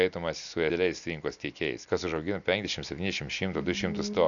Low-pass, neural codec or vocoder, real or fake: 7.2 kHz; none; real